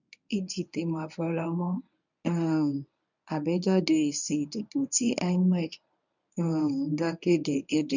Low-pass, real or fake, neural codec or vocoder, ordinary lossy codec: 7.2 kHz; fake; codec, 24 kHz, 0.9 kbps, WavTokenizer, medium speech release version 1; none